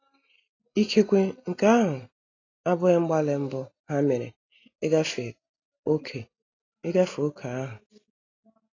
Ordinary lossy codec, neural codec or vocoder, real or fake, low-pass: AAC, 32 kbps; none; real; 7.2 kHz